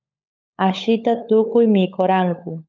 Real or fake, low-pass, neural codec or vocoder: fake; 7.2 kHz; codec, 16 kHz, 16 kbps, FunCodec, trained on LibriTTS, 50 frames a second